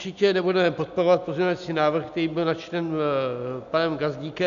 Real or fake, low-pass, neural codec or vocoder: real; 7.2 kHz; none